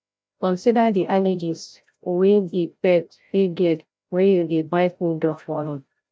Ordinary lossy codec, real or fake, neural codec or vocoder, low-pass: none; fake; codec, 16 kHz, 0.5 kbps, FreqCodec, larger model; none